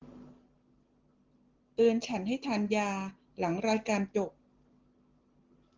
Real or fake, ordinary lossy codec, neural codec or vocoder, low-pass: real; Opus, 16 kbps; none; 7.2 kHz